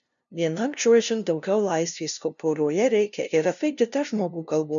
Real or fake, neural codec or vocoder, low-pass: fake; codec, 16 kHz, 0.5 kbps, FunCodec, trained on LibriTTS, 25 frames a second; 7.2 kHz